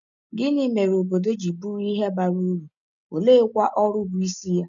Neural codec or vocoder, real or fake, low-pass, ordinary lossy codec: none; real; 7.2 kHz; none